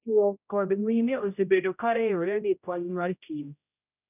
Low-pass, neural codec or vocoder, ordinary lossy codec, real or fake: 3.6 kHz; codec, 16 kHz, 0.5 kbps, X-Codec, HuBERT features, trained on general audio; none; fake